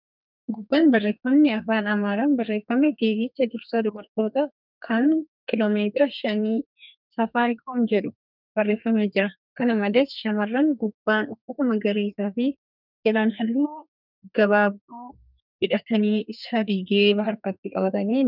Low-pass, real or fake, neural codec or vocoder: 5.4 kHz; fake; codec, 32 kHz, 1.9 kbps, SNAC